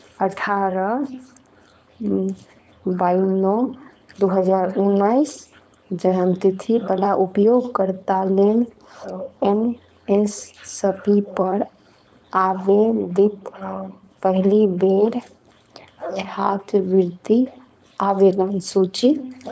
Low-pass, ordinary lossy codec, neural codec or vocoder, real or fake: none; none; codec, 16 kHz, 4.8 kbps, FACodec; fake